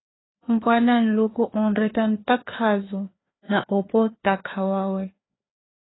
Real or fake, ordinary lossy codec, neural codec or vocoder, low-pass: fake; AAC, 16 kbps; codec, 16 kHz, 2 kbps, FreqCodec, larger model; 7.2 kHz